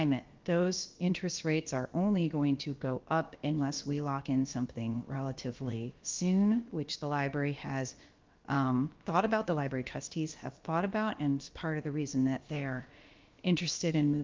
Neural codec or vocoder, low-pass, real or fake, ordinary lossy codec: codec, 16 kHz, about 1 kbps, DyCAST, with the encoder's durations; 7.2 kHz; fake; Opus, 32 kbps